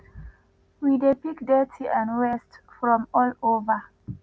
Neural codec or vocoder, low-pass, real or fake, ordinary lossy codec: none; none; real; none